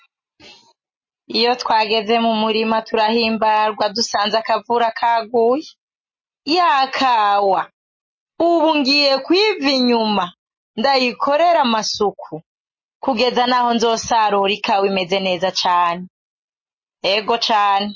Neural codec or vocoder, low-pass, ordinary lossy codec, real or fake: none; 7.2 kHz; MP3, 32 kbps; real